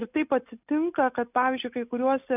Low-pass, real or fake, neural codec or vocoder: 3.6 kHz; real; none